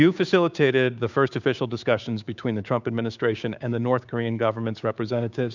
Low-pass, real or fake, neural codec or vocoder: 7.2 kHz; fake; codec, 16 kHz, 6 kbps, DAC